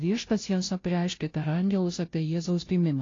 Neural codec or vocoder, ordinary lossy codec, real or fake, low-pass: codec, 16 kHz, 0.5 kbps, FunCodec, trained on Chinese and English, 25 frames a second; AAC, 32 kbps; fake; 7.2 kHz